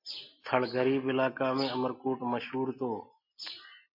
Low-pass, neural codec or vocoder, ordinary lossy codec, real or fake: 5.4 kHz; none; MP3, 48 kbps; real